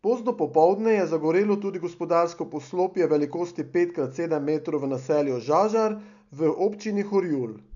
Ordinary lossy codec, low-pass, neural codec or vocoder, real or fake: MP3, 96 kbps; 7.2 kHz; none; real